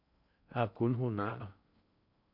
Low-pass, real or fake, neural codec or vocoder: 5.4 kHz; fake; codec, 16 kHz in and 24 kHz out, 0.6 kbps, FocalCodec, streaming, 2048 codes